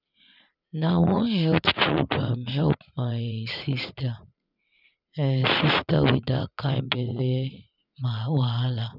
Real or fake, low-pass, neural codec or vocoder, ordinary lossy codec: fake; 5.4 kHz; vocoder, 44.1 kHz, 80 mel bands, Vocos; none